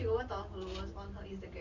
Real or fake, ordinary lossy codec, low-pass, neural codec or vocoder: real; none; 7.2 kHz; none